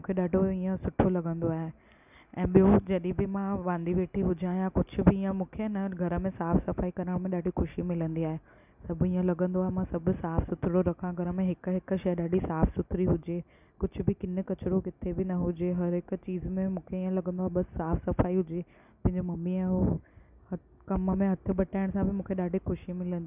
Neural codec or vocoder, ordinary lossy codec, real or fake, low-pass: none; none; real; 3.6 kHz